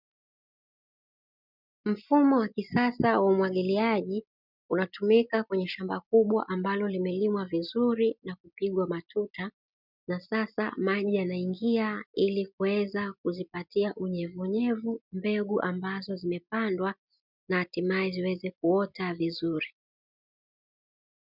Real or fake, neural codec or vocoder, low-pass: real; none; 5.4 kHz